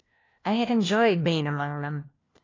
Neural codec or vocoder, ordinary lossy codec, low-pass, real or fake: codec, 16 kHz, 1 kbps, FunCodec, trained on LibriTTS, 50 frames a second; AAC, 32 kbps; 7.2 kHz; fake